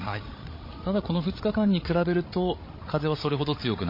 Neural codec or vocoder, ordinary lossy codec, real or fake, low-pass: codec, 16 kHz, 16 kbps, FreqCodec, larger model; MP3, 24 kbps; fake; 5.4 kHz